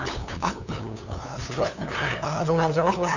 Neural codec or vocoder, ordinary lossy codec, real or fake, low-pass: codec, 16 kHz, 2 kbps, FunCodec, trained on LibriTTS, 25 frames a second; none; fake; 7.2 kHz